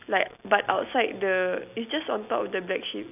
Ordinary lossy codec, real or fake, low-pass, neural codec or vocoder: none; real; 3.6 kHz; none